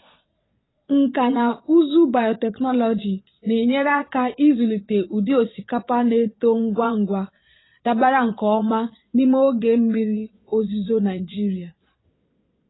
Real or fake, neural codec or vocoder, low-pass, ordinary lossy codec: fake; vocoder, 22.05 kHz, 80 mel bands, Vocos; 7.2 kHz; AAC, 16 kbps